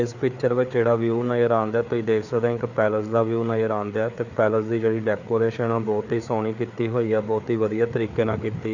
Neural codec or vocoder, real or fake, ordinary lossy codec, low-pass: codec, 16 kHz, 4 kbps, FreqCodec, larger model; fake; none; 7.2 kHz